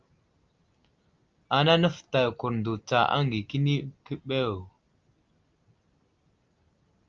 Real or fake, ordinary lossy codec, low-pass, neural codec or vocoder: real; Opus, 24 kbps; 7.2 kHz; none